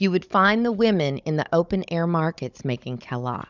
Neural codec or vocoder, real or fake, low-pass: codec, 16 kHz, 16 kbps, FunCodec, trained on Chinese and English, 50 frames a second; fake; 7.2 kHz